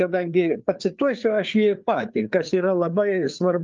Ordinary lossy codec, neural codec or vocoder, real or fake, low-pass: Opus, 24 kbps; codec, 16 kHz, 4 kbps, FreqCodec, larger model; fake; 7.2 kHz